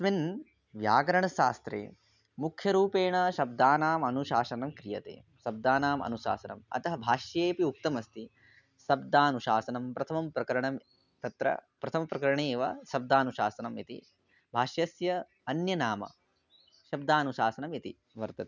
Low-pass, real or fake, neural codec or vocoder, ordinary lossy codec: 7.2 kHz; real; none; none